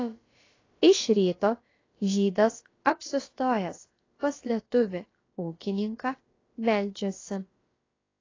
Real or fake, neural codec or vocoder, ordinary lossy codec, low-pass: fake; codec, 16 kHz, about 1 kbps, DyCAST, with the encoder's durations; AAC, 32 kbps; 7.2 kHz